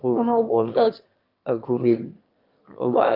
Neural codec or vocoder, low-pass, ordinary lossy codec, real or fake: autoencoder, 22.05 kHz, a latent of 192 numbers a frame, VITS, trained on one speaker; 5.4 kHz; Opus, 24 kbps; fake